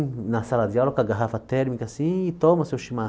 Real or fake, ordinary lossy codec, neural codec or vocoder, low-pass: real; none; none; none